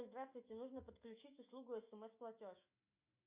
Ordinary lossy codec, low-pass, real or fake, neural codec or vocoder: Opus, 64 kbps; 3.6 kHz; fake; autoencoder, 48 kHz, 128 numbers a frame, DAC-VAE, trained on Japanese speech